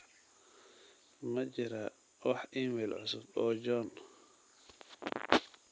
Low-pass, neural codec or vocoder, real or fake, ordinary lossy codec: none; none; real; none